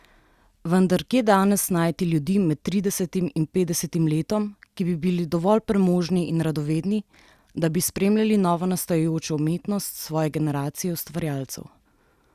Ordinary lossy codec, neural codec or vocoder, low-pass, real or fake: Opus, 64 kbps; none; 14.4 kHz; real